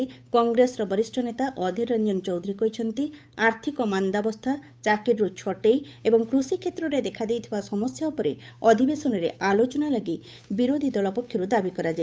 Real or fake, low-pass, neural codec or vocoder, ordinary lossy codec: fake; none; codec, 16 kHz, 8 kbps, FunCodec, trained on Chinese and English, 25 frames a second; none